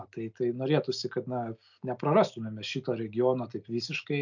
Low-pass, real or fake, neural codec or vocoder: 7.2 kHz; real; none